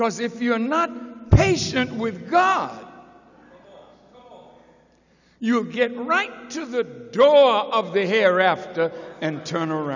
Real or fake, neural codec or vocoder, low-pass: real; none; 7.2 kHz